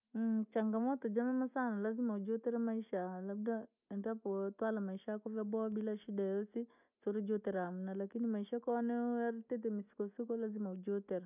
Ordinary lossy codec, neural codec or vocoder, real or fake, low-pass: none; none; real; 3.6 kHz